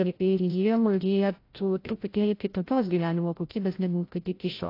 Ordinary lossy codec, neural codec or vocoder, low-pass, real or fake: AAC, 32 kbps; codec, 16 kHz, 0.5 kbps, FreqCodec, larger model; 5.4 kHz; fake